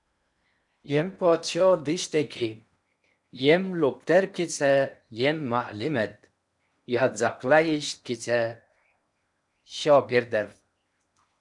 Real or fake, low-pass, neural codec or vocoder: fake; 10.8 kHz; codec, 16 kHz in and 24 kHz out, 0.8 kbps, FocalCodec, streaming, 65536 codes